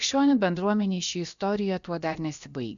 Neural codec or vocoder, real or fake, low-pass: codec, 16 kHz, about 1 kbps, DyCAST, with the encoder's durations; fake; 7.2 kHz